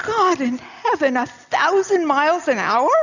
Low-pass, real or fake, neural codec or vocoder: 7.2 kHz; real; none